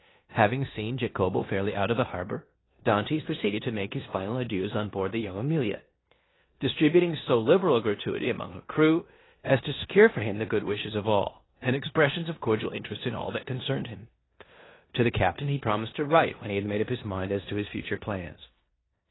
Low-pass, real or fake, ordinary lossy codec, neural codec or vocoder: 7.2 kHz; fake; AAC, 16 kbps; codec, 16 kHz in and 24 kHz out, 0.9 kbps, LongCat-Audio-Codec, four codebook decoder